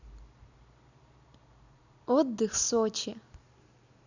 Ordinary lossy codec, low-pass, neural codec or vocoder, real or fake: none; 7.2 kHz; none; real